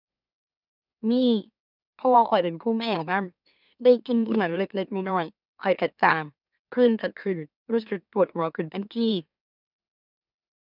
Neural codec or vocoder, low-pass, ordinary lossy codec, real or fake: autoencoder, 44.1 kHz, a latent of 192 numbers a frame, MeloTTS; 5.4 kHz; none; fake